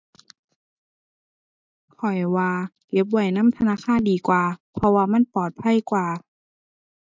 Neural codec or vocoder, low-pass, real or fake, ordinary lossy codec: none; 7.2 kHz; real; MP3, 64 kbps